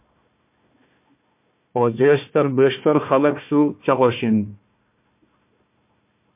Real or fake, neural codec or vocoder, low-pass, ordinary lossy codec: fake; codec, 16 kHz, 1 kbps, FunCodec, trained on Chinese and English, 50 frames a second; 3.6 kHz; MP3, 32 kbps